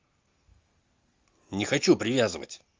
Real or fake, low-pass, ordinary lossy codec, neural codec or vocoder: real; 7.2 kHz; Opus, 32 kbps; none